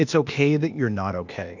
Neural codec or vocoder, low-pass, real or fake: codec, 16 kHz, 0.8 kbps, ZipCodec; 7.2 kHz; fake